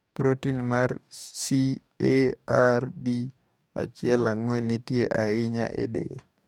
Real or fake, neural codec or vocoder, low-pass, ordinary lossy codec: fake; codec, 44.1 kHz, 2.6 kbps, DAC; 19.8 kHz; MP3, 96 kbps